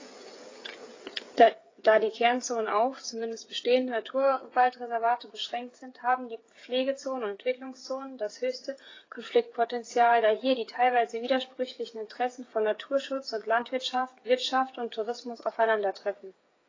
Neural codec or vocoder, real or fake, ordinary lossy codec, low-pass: codec, 16 kHz, 8 kbps, FreqCodec, smaller model; fake; AAC, 32 kbps; 7.2 kHz